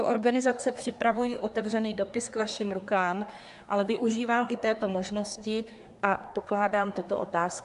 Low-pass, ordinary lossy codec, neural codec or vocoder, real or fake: 10.8 kHz; AAC, 96 kbps; codec, 24 kHz, 1 kbps, SNAC; fake